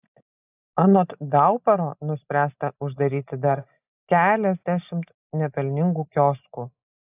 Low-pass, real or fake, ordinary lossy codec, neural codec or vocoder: 3.6 kHz; real; AAC, 24 kbps; none